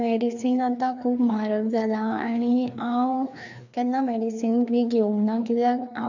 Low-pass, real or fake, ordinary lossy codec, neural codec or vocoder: 7.2 kHz; fake; none; codec, 16 kHz, 2 kbps, FreqCodec, larger model